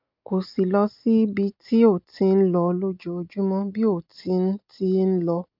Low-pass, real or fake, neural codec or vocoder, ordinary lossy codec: 5.4 kHz; real; none; MP3, 48 kbps